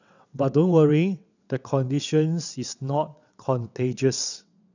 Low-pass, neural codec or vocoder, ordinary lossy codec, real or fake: 7.2 kHz; vocoder, 22.05 kHz, 80 mel bands, WaveNeXt; none; fake